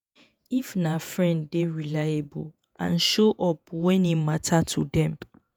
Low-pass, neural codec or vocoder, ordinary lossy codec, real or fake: none; vocoder, 48 kHz, 128 mel bands, Vocos; none; fake